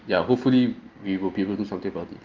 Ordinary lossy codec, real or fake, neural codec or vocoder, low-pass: Opus, 32 kbps; real; none; 7.2 kHz